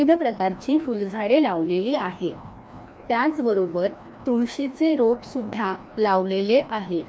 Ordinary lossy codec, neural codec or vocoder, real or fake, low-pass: none; codec, 16 kHz, 1 kbps, FreqCodec, larger model; fake; none